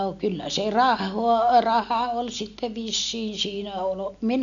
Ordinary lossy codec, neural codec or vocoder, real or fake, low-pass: none; none; real; 7.2 kHz